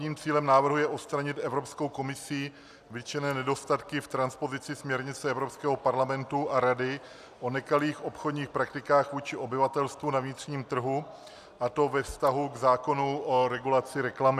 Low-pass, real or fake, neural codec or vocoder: 14.4 kHz; real; none